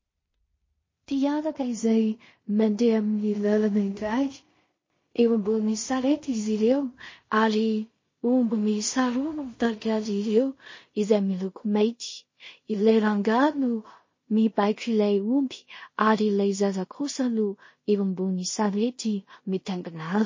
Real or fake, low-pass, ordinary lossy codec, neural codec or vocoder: fake; 7.2 kHz; MP3, 32 kbps; codec, 16 kHz in and 24 kHz out, 0.4 kbps, LongCat-Audio-Codec, two codebook decoder